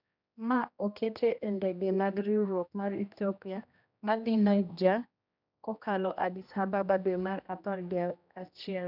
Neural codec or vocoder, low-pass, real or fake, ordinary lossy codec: codec, 16 kHz, 1 kbps, X-Codec, HuBERT features, trained on general audio; 5.4 kHz; fake; none